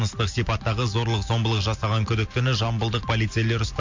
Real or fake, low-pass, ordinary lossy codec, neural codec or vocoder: real; 7.2 kHz; MP3, 48 kbps; none